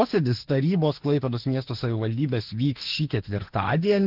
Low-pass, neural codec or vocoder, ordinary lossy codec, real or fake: 5.4 kHz; autoencoder, 48 kHz, 32 numbers a frame, DAC-VAE, trained on Japanese speech; Opus, 16 kbps; fake